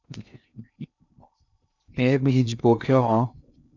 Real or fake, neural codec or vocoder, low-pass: fake; codec, 16 kHz in and 24 kHz out, 0.6 kbps, FocalCodec, streaming, 2048 codes; 7.2 kHz